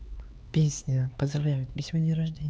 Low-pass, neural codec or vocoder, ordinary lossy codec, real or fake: none; codec, 16 kHz, 4 kbps, X-Codec, HuBERT features, trained on LibriSpeech; none; fake